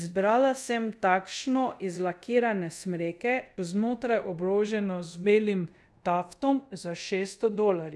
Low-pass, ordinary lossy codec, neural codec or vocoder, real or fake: none; none; codec, 24 kHz, 0.5 kbps, DualCodec; fake